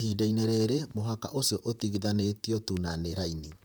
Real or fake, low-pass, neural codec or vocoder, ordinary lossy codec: fake; none; vocoder, 44.1 kHz, 128 mel bands, Pupu-Vocoder; none